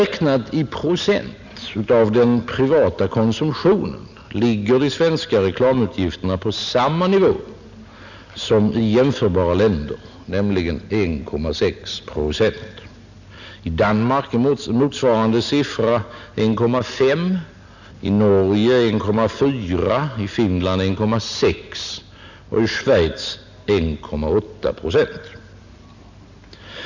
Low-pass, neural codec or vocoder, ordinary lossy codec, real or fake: 7.2 kHz; none; none; real